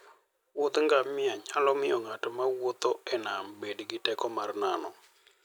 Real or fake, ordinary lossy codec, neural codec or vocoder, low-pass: real; none; none; none